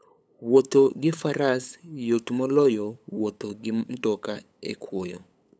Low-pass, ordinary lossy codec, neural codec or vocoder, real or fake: none; none; codec, 16 kHz, 8 kbps, FunCodec, trained on LibriTTS, 25 frames a second; fake